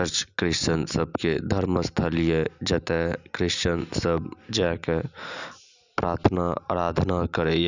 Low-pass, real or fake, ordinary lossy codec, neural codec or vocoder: 7.2 kHz; real; Opus, 64 kbps; none